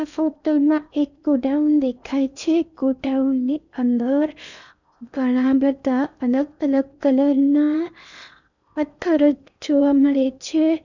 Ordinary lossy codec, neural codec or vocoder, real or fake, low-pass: none; codec, 16 kHz in and 24 kHz out, 0.8 kbps, FocalCodec, streaming, 65536 codes; fake; 7.2 kHz